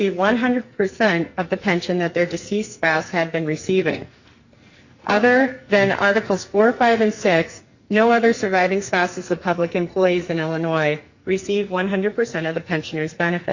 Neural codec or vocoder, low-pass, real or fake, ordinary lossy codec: codec, 44.1 kHz, 2.6 kbps, SNAC; 7.2 kHz; fake; Opus, 64 kbps